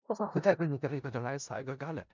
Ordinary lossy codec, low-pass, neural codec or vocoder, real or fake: MP3, 64 kbps; 7.2 kHz; codec, 16 kHz in and 24 kHz out, 0.4 kbps, LongCat-Audio-Codec, four codebook decoder; fake